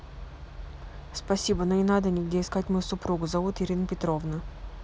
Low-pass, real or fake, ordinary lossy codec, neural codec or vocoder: none; real; none; none